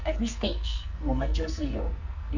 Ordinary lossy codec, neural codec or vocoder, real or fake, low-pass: none; codec, 44.1 kHz, 2.6 kbps, SNAC; fake; 7.2 kHz